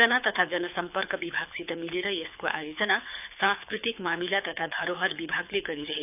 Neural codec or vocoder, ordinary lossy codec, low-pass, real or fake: codec, 24 kHz, 6 kbps, HILCodec; none; 3.6 kHz; fake